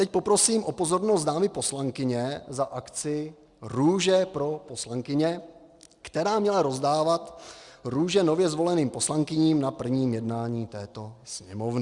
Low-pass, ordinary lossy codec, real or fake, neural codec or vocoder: 10.8 kHz; Opus, 64 kbps; real; none